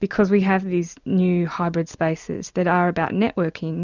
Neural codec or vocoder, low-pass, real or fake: none; 7.2 kHz; real